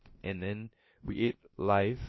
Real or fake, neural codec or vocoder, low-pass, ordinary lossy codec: fake; codec, 16 kHz, about 1 kbps, DyCAST, with the encoder's durations; 7.2 kHz; MP3, 24 kbps